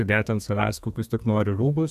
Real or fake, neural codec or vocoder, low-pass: fake; codec, 32 kHz, 1.9 kbps, SNAC; 14.4 kHz